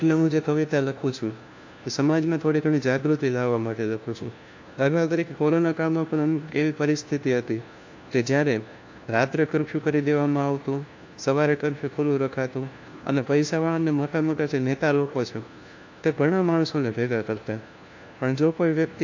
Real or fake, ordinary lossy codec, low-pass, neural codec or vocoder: fake; AAC, 48 kbps; 7.2 kHz; codec, 16 kHz, 1 kbps, FunCodec, trained on LibriTTS, 50 frames a second